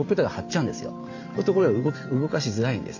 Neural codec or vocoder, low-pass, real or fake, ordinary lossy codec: vocoder, 44.1 kHz, 128 mel bands every 512 samples, BigVGAN v2; 7.2 kHz; fake; AAC, 48 kbps